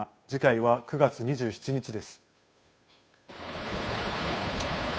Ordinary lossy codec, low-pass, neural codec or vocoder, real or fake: none; none; codec, 16 kHz, 2 kbps, FunCodec, trained on Chinese and English, 25 frames a second; fake